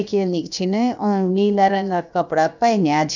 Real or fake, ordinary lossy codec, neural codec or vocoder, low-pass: fake; none; codec, 16 kHz, about 1 kbps, DyCAST, with the encoder's durations; 7.2 kHz